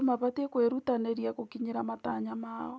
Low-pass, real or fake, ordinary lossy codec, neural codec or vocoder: none; real; none; none